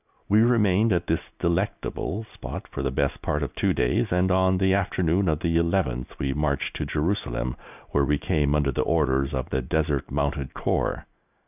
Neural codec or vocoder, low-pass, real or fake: none; 3.6 kHz; real